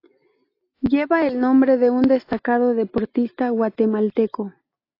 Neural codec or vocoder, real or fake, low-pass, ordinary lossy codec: none; real; 5.4 kHz; AAC, 32 kbps